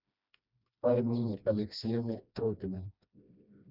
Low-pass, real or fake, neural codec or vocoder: 5.4 kHz; fake; codec, 16 kHz, 1 kbps, FreqCodec, smaller model